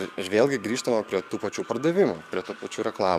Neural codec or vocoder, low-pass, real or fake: codec, 44.1 kHz, 7.8 kbps, DAC; 14.4 kHz; fake